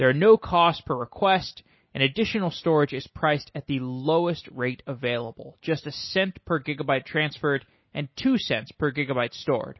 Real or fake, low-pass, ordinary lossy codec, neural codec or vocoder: real; 7.2 kHz; MP3, 24 kbps; none